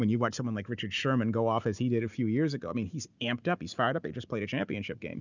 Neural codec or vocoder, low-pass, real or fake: none; 7.2 kHz; real